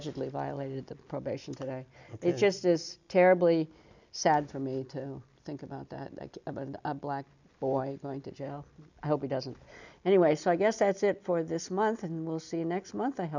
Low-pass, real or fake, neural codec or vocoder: 7.2 kHz; real; none